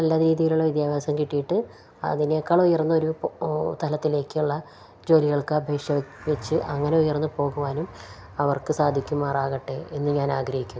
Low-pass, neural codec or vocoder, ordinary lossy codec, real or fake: none; none; none; real